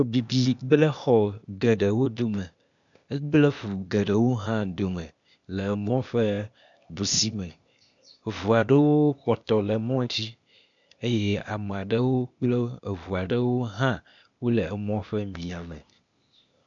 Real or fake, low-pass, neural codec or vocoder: fake; 7.2 kHz; codec, 16 kHz, 0.8 kbps, ZipCodec